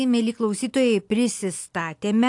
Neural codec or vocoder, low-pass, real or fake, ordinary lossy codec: none; 10.8 kHz; real; AAC, 64 kbps